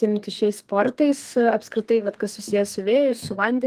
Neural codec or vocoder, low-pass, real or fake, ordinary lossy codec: codec, 32 kHz, 1.9 kbps, SNAC; 14.4 kHz; fake; Opus, 24 kbps